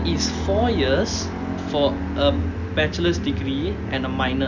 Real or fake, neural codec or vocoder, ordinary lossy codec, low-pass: real; none; none; 7.2 kHz